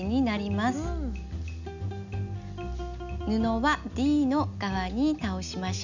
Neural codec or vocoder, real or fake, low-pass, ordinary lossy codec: none; real; 7.2 kHz; none